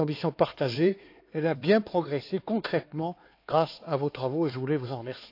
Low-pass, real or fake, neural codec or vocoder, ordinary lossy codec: 5.4 kHz; fake; codec, 16 kHz, 4 kbps, X-Codec, HuBERT features, trained on LibriSpeech; AAC, 32 kbps